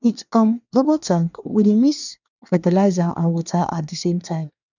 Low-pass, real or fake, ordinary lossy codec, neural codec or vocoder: 7.2 kHz; fake; none; codec, 24 kHz, 1 kbps, SNAC